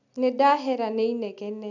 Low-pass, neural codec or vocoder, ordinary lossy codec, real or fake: 7.2 kHz; none; none; real